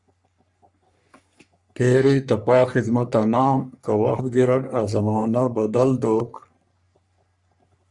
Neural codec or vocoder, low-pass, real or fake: codec, 44.1 kHz, 3.4 kbps, Pupu-Codec; 10.8 kHz; fake